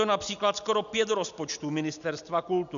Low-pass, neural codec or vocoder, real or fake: 7.2 kHz; none; real